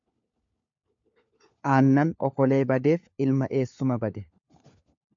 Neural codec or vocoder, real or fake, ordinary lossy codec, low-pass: codec, 16 kHz, 4 kbps, FunCodec, trained on LibriTTS, 50 frames a second; fake; AAC, 64 kbps; 7.2 kHz